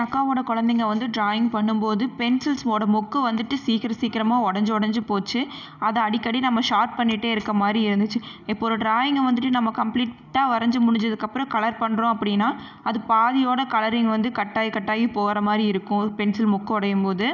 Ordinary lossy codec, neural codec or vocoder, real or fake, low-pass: none; none; real; 7.2 kHz